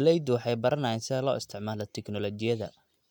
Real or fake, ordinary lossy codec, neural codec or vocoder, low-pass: real; none; none; 19.8 kHz